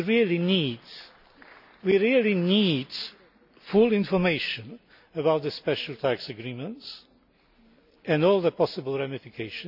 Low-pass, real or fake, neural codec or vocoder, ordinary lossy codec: 5.4 kHz; real; none; none